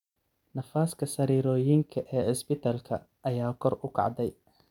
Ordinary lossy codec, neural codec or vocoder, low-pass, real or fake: none; none; 19.8 kHz; real